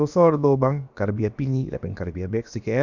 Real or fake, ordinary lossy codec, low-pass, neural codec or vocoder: fake; none; 7.2 kHz; codec, 16 kHz, about 1 kbps, DyCAST, with the encoder's durations